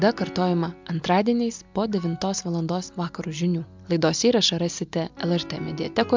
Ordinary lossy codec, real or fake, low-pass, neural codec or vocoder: MP3, 64 kbps; real; 7.2 kHz; none